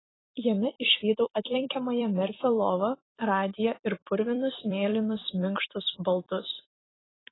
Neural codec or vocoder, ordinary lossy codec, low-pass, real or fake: vocoder, 44.1 kHz, 128 mel bands every 256 samples, BigVGAN v2; AAC, 16 kbps; 7.2 kHz; fake